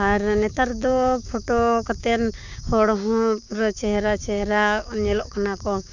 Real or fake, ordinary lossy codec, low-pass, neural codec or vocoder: fake; none; 7.2 kHz; codec, 16 kHz, 6 kbps, DAC